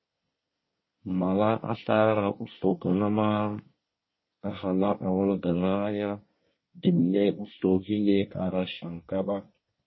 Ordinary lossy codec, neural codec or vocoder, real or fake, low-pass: MP3, 24 kbps; codec, 24 kHz, 1 kbps, SNAC; fake; 7.2 kHz